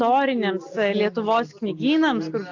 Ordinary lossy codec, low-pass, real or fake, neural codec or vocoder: AAC, 48 kbps; 7.2 kHz; real; none